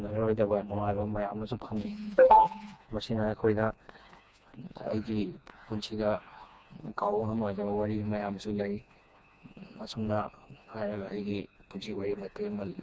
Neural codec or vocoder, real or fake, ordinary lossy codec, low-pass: codec, 16 kHz, 2 kbps, FreqCodec, smaller model; fake; none; none